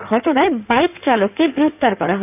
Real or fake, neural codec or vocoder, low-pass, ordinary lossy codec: fake; codec, 16 kHz in and 24 kHz out, 2.2 kbps, FireRedTTS-2 codec; 3.6 kHz; none